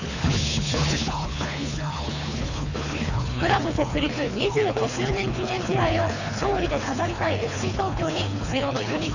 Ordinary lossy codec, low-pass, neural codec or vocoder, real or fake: none; 7.2 kHz; codec, 24 kHz, 3 kbps, HILCodec; fake